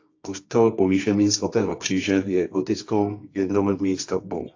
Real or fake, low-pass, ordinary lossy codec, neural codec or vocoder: fake; 7.2 kHz; AAC, 48 kbps; codec, 24 kHz, 0.9 kbps, WavTokenizer, medium music audio release